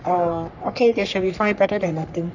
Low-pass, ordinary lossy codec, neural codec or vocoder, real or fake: 7.2 kHz; none; codec, 44.1 kHz, 3.4 kbps, Pupu-Codec; fake